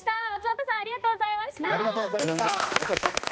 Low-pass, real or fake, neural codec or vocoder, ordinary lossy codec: none; fake; codec, 16 kHz, 2 kbps, X-Codec, HuBERT features, trained on balanced general audio; none